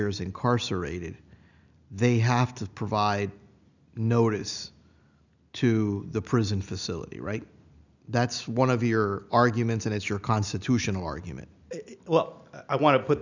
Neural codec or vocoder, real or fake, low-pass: none; real; 7.2 kHz